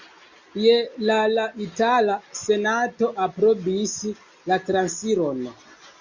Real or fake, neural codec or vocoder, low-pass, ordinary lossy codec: real; none; 7.2 kHz; Opus, 64 kbps